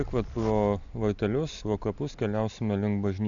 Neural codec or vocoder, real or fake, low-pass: none; real; 7.2 kHz